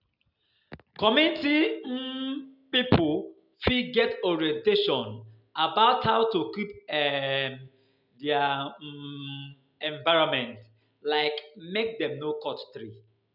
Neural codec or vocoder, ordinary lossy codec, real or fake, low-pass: none; none; real; 5.4 kHz